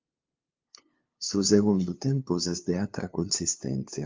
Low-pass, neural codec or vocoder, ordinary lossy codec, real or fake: 7.2 kHz; codec, 16 kHz, 2 kbps, FunCodec, trained on LibriTTS, 25 frames a second; Opus, 24 kbps; fake